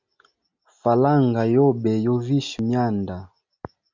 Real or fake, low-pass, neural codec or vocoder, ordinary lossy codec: real; 7.2 kHz; none; MP3, 64 kbps